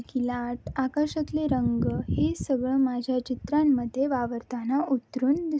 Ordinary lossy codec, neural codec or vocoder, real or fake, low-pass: none; none; real; none